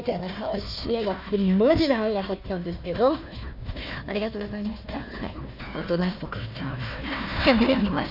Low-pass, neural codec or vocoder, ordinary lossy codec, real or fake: 5.4 kHz; codec, 16 kHz, 1 kbps, FunCodec, trained on Chinese and English, 50 frames a second; none; fake